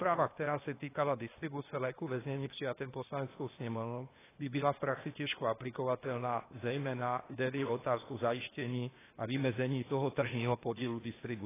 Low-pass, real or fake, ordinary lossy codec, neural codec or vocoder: 3.6 kHz; fake; AAC, 16 kbps; codec, 16 kHz, 0.8 kbps, ZipCodec